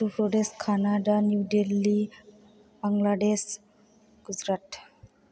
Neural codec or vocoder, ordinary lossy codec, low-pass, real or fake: none; none; none; real